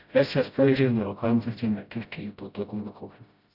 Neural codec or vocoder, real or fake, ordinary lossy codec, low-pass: codec, 16 kHz, 0.5 kbps, FreqCodec, smaller model; fake; none; 5.4 kHz